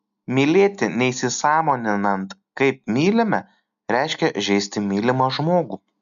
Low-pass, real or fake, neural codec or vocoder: 7.2 kHz; real; none